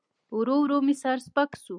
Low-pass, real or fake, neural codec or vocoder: 9.9 kHz; real; none